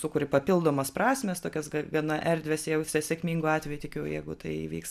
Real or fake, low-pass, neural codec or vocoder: real; 14.4 kHz; none